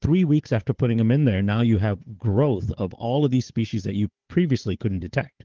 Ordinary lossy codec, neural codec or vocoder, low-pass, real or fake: Opus, 16 kbps; codec, 16 kHz, 4 kbps, FunCodec, trained on Chinese and English, 50 frames a second; 7.2 kHz; fake